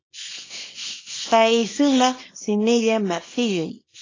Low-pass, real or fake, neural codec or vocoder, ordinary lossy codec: 7.2 kHz; fake; codec, 24 kHz, 0.9 kbps, WavTokenizer, small release; AAC, 32 kbps